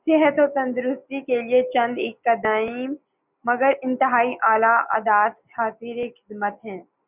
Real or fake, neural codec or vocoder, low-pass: real; none; 3.6 kHz